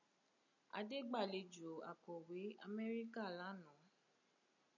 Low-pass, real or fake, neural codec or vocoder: 7.2 kHz; real; none